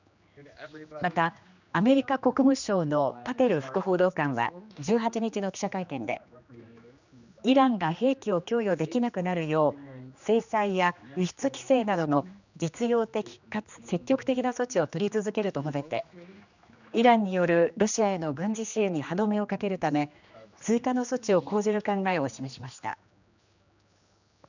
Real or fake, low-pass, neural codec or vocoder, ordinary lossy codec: fake; 7.2 kHz; codec, 16 kHz, 2 kbps, X-Codec, HuBERT features, trained on general audio; none